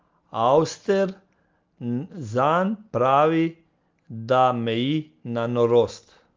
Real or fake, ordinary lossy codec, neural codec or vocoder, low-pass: real; Opus, 32 kbps; none; 7.2 kHz